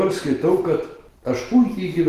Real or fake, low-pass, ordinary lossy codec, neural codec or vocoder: real; 14.4 kHz; Opus, 16 kbps; none